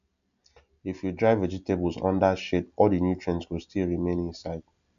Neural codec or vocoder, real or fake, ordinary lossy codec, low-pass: none; real; none; 7.2 kHz